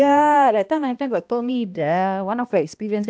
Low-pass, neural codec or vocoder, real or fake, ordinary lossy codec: none; codec, 16 kHz, 1 kbps, X-Codec, HuBERT features, trained on balanced general audio; fake; none